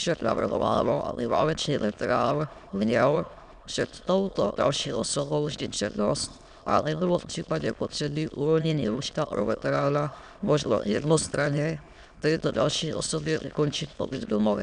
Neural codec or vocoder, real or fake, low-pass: autoencoder, 22.05 kHz, a latent of 192 numbers a frame, VITS, trained on many speakers; fake; 9.9 kHz